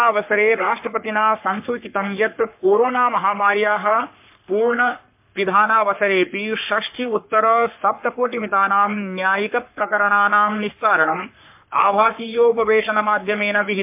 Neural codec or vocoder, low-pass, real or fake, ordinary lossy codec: codec, 44.1 kHz, 3.4 kbps, Pupu-Codec; 3.6 kHz; fake; MP3, 32 kbps